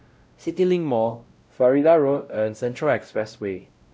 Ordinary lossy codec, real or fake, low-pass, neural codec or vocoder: none; fake; none; codec, 16 kHz, 0.5 kbps, X-Codec, WavLM features, trained on Multilingual LibriSpeech